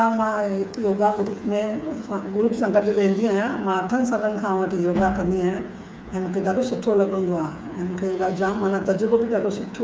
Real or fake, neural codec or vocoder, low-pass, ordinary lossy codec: fake; codec, 16 kHz, 4 kbps, FreqCodec, smaller model; none; none